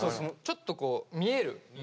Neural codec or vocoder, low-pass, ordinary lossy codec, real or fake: none; none; none; real